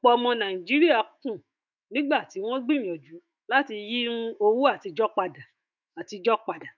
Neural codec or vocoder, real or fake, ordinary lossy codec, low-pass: autoencoder, 48 kHz, 128 numbers a frame, DAC-VAE, trained on Japanese speech; fake; none; 7.2 kHz